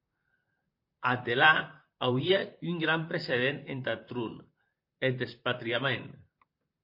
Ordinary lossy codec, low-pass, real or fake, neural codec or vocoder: MP3, 32 kbps; 5.4 kHz; fake; vocoder, 44.1 kHz, 128 mel bands, Pupu-Vocoder